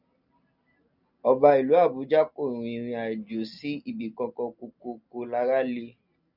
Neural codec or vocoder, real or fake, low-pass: none; real; 5.4 kHz